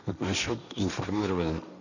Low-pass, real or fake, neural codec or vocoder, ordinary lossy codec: 7.2 kHz; fake; codec, 16 kHz, 1.1 kbps, Voila-Tokenizer; none